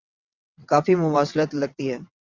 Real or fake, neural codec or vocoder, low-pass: fake; vocoder, 22.05 kHz, 80 mel bands, WaveNeXt; 7.2 kHz